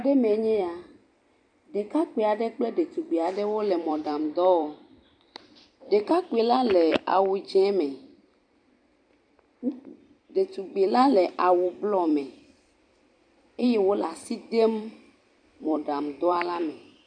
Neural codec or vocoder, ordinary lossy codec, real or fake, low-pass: vocoder, 44.1 kHz, 128 mel bands every 256 samples, BigVGAN v2; AAC, 64 kbps; fake; 9.9 kHz